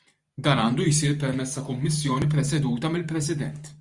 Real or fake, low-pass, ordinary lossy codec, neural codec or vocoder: fake; 10.8 kHz; Opus, 64 kbps; vocoder, 44.1 kHz, 128 mel bands every 512 samples, BigVGAN v2